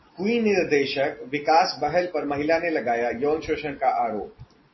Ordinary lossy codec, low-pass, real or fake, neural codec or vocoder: MP3, 24 kbps; 7.2 kHz; real; none